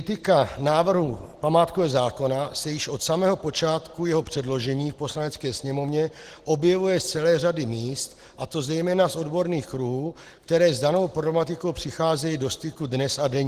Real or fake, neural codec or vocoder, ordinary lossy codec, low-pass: real; none; Opus, 16 kbps; 14.4 kHz